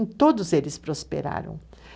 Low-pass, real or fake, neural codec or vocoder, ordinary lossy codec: none; real; none; none